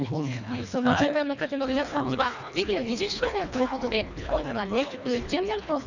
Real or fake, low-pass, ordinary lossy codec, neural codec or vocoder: fake; 7.2 kHz; none; codec, 24 kHz, 1.5 kbps, HILCodec